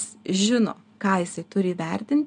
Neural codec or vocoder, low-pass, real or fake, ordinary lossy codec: none; 9.9 kHz; real; MP3, 96 kbps